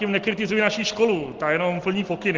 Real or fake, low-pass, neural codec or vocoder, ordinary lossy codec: real; 7.2 kHz; none; Opus, 16 kbps